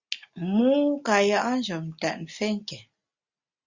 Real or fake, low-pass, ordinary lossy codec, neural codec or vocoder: real; 7.2 kHz; Opus, 64 kbps; none